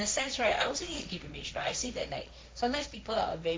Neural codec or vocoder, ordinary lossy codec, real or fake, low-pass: codec, 16 kHz, 1.1 kbps, Voila-Tokenizer; none; fake; none